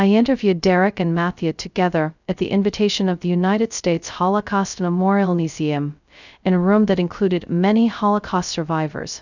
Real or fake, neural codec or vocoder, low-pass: fake; codec, 16 kHz, 0.2 kbps, FocalCodec; 7.2 kHz